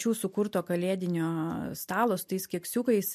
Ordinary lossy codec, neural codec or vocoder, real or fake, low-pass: MP3, 64 kbps; none; real; 14.4 kHz